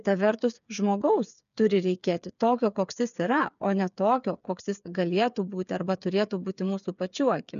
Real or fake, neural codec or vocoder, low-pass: fake; codec, 16 kHz, 8 kbps, FreqCodec, smaller model; 7.2 kHz